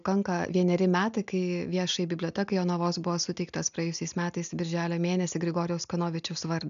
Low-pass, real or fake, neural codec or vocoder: 7.2 kHz; real; none